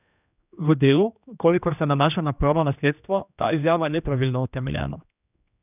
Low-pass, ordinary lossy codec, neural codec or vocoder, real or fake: 3.6 kHz; none; codec, 16 kHz, 1 kbps, X-Codec, HuBERT features, trained on general audio; fake